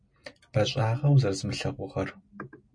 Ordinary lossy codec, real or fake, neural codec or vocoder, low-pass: MP3, 48 kbps; real; none; 9.9 kHz